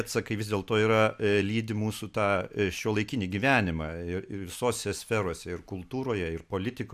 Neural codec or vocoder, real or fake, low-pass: none; real; 14.4 kHz